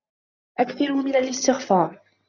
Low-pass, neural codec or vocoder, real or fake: 7.2 kHz; none; real